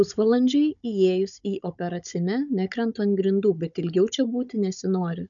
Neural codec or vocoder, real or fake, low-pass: codec, 16 kHz, 16 kbps, FunCodec, trained on Chinese and English, 50 frames a second; fake; 7.2 kHz